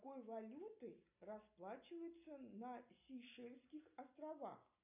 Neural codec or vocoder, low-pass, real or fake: none; 3.6 kHz; real